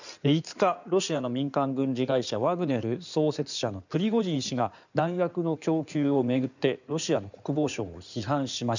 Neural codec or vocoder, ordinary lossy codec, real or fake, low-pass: codec, 16 kHz in and 24 kHz out, 2.2 kbps, FireRedTTS-2 codec; none; fake; 7.2 kHz